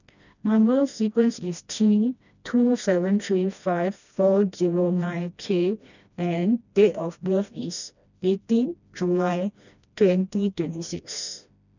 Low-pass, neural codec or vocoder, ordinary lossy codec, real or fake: 7.2 kHz; codec, 16 kHz, 1 kbps, FreqCodec, smaller model; none; fake